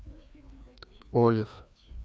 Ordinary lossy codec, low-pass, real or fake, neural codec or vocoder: none; none; fake; codec, 16 kHz, 2 kbps, FreqCodec, larger model